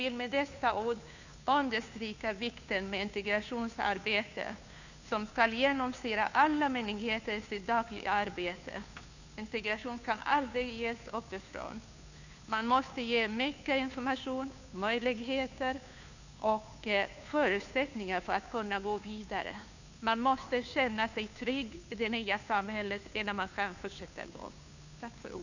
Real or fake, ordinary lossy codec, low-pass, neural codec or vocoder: fake; none; 7.2 kHz; codec, 16 kHz, 2 kbps, FunCodec, trained on Chinese and English, 25 frames a second